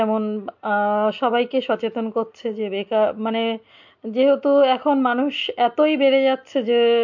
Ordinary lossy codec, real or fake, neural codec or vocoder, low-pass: MP3, 48 kbps; real; none; 7.2 kHz